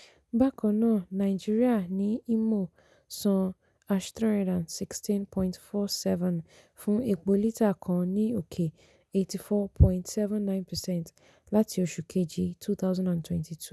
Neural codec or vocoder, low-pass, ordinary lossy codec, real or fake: none; none; none; real